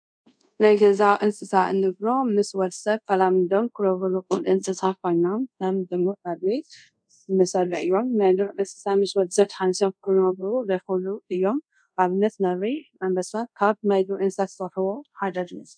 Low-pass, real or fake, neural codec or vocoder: 9.9 kHz; fake; codec, 24 kHz, 0.5 kbps, DualCodec